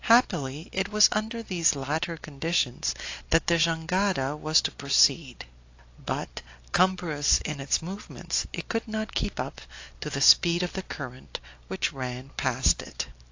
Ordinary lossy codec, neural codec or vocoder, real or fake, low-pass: AAC, 48 kbps; none; real; 7.2 kHz